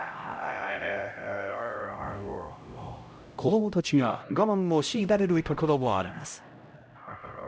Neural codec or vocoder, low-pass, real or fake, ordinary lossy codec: codec, 16 kHz, 0.5 kbps, X-Codec, HuBERT features, trained on LibriSpeech; none; fake; none